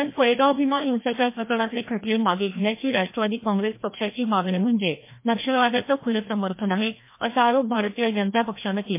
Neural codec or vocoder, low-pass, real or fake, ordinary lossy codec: codec, 16 kHz, 1 kbps, FreqCodec, larger model; 3.6 kHz; fake; MP3, 24 kbps